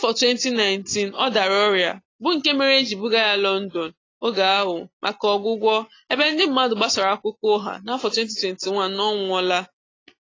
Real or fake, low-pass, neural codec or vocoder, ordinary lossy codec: real; 7.2 kHz; none; AAC, 32 kbps